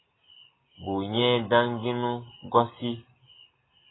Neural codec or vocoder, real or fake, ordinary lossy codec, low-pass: none; real; AAC, 16 kbps; 7.2 kHz